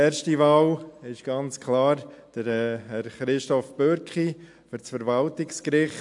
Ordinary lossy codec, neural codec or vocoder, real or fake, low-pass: AAC, 64 kbps; none; real; 10.8 kHz